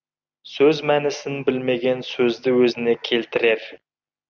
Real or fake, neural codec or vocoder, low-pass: real; none; 7.2 kHz